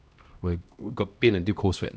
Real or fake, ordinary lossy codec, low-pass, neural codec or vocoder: fake; none; none; codec, 16 kHz, 1 kbps, X-Codec, HuBERT features, trained on LibriSpeech